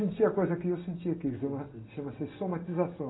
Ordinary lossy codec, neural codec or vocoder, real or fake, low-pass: AAC, 16 kbps; none; real; 7.2 kHz